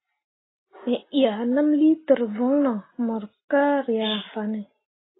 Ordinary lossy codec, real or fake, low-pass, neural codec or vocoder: AAC, 16 kbps; real; 7.2 kHz; none